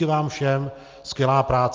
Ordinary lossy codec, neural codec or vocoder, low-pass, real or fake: Opus, 24 kbps; none; 7.2 kHz; real